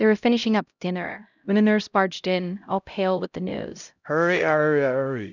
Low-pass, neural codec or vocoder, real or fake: 7.2 kHz; codec, 16 kHz, 0.5 kbps, X-Codec, HuBERT features, trained on LibriSpeech; fake